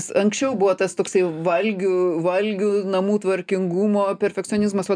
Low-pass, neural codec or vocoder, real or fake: 9.9 kHz; none; real